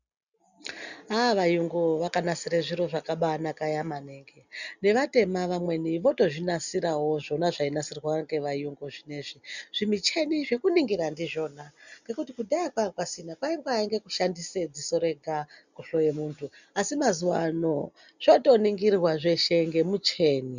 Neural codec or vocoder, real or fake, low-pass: none; real; 7.2 kHz